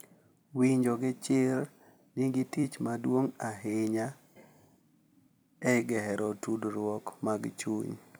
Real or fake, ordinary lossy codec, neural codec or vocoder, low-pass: fake; none; vocoder, 44.1 kHz, 128 mel bands every 256 samples, BigVGAN v2; none